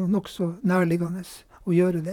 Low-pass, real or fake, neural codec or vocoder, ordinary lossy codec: 19.8 kHz; fake; vocoder, 44.1 kHz, 128 mel bands, Pupu-Vocoder; none